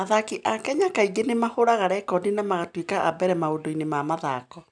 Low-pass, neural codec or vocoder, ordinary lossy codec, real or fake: 9.9 kHz; none; none; real